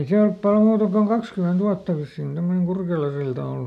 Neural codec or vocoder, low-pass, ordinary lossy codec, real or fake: none; 14.4 kHz; none; real